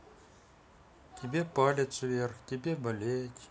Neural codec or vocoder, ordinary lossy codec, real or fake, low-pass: none; none; real; none